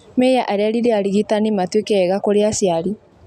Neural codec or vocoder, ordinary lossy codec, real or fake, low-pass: none; none; real; 14.4 kHz